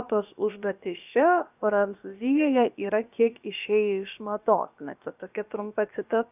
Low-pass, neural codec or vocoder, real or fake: 3.6 kHz; codec, 16 kHz, about 1 kbps, DyCAST, with the encoder's durations; fake